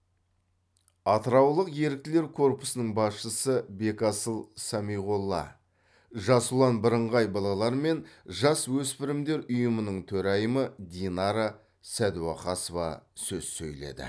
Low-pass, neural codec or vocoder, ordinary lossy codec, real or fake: none; none; none; real